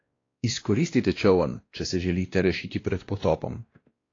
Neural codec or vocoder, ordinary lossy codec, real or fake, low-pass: codec, 16 kHz, 1 kbps, X-Codec, WavLM features, trained on Multilingual LibriSpeech; AAC, 32 kbps; fake; 7.2 kHz